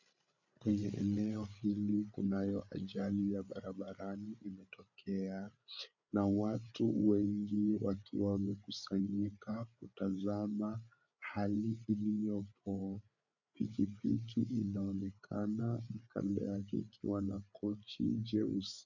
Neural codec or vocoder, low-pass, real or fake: codec, 16 kHz, 8 kbps, FreqCodec, larger model; 7.2 kHz; fake